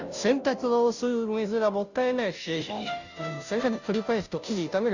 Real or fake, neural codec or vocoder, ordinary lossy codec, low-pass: fake; codec, 16 kHz, 0.5 kbps, FunCodec, trained on Chinese and English, 25 frames a second; none; 7.2 kHz